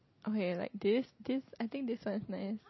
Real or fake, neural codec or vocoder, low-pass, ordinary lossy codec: real; none; 7.2 kHz; MP3, 24 kbps